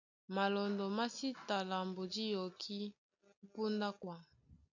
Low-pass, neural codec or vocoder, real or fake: 7.2 kHz; none; real